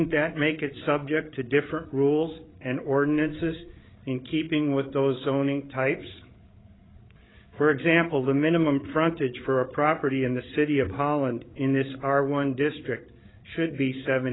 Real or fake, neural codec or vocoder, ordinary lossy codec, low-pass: fake; codec, 16 kHz, 8 kbps, FreqCodec, larger model; AAC, 16 kbps; 7.2 kHz